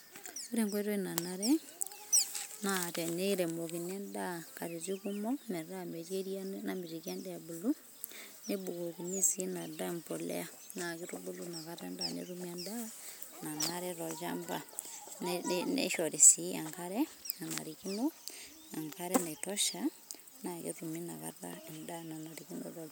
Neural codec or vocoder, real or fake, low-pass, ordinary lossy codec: none; real; none; none